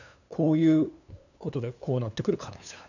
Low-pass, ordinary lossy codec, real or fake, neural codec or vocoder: 7.2 kHz; none; fake; codec, 16 kHz, 2 kbps, FunCodec, trained on LibriTTS, 25 frames a second